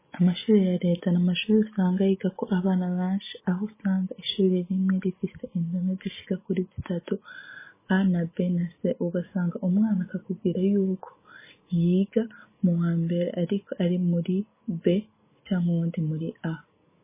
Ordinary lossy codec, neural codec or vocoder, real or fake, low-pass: MP3, 16 kbps; none; real; 3.6 kHz